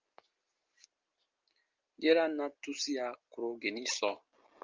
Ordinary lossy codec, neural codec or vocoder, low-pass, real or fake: Opus, 32 kbps; none; 7.2 kHz; real